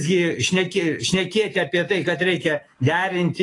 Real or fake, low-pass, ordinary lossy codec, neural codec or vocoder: fake; 10.8 kHz; AAC, 32 kbps; autoencoder, 48 kHz, 128 numbers a frame, DAC-VAE, trained on Japanese speech